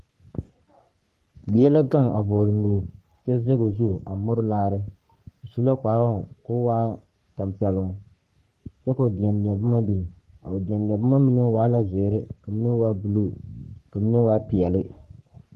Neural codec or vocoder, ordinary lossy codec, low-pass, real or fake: codec, 44.1 kHz, 3.4 kbps, Pupu-Codec; Opus, 24 kbps; 14.4 kHz; fake